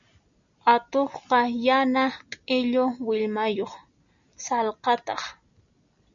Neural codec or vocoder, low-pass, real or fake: none; 7.2 kHz; real